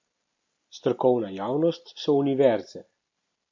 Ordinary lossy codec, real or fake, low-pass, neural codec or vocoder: MP3, 48 kbps; real; 7.2 kHz; none